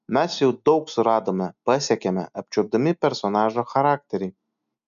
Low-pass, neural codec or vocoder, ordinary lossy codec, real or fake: 7.2 kHz; none; MP3, 96 kbps; real